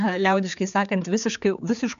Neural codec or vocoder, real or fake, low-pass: codec, 16 kHz, 4 kbps, X-Codec, HuBERT features, trained on general audio; fake; 7.2 kHz